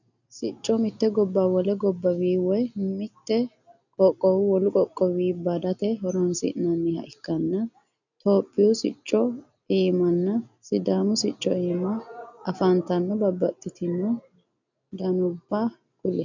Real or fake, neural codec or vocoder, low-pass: real; none; 7.2 kHz